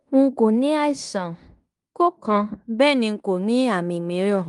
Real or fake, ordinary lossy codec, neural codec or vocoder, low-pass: fake; Opus, 32 kbps; codec, 16 kHz in and 24 kHz out, 0.9 kbps, LongCat-Audio-Codec, fine tuned four codebook decoder; 10.8 kHz